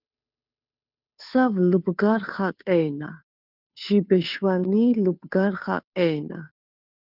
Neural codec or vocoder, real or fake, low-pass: codec, 16 kHz, 2 kbps, FunCodec, trained on Chinese and English, 25 frames a second; fake; 5.4 kHz